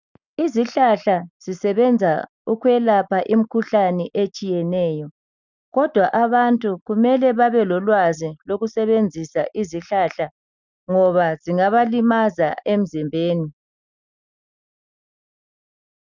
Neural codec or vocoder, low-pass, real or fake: none; 7.2 kHz; real